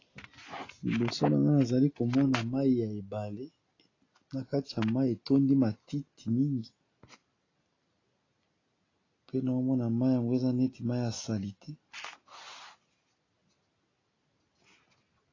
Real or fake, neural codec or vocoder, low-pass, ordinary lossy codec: real; none; 7.2 kHz; AAC, 32 kbps